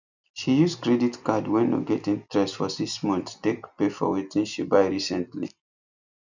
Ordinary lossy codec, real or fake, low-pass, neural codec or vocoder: none; real; 7.2 kHz; none